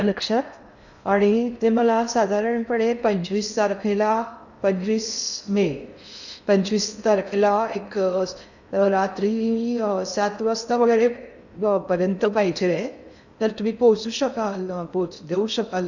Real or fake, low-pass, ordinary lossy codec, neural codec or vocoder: fake; 7.2 kHz; none; codec, 16 kHz in and 24 kHz out, 0.6 kbps, FocalCodec, streaming, 4096 codes